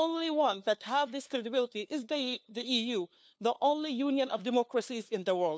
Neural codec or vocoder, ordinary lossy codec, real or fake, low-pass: codec, 16 kHz, 2 kbps, FunCodec, trained on LibriTTS, 25 frames a second; none; fake; none